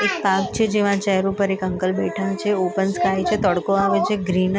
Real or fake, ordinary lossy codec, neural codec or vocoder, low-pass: real; none; none; none